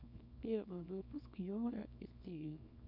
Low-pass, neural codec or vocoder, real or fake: 5.4 kHz; codec, 24 kHz, 0.9 kbps, WavTokenizer, small release; fake